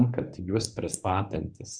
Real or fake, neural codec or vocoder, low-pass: fake; codec, 24 kHz, 0.9 kbps, WavTokenizer, medium speech release version 1; 9.9 kHz